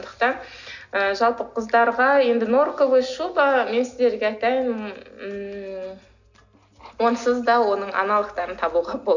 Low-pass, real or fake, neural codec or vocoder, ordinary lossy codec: 7.2 kHz; real; none; none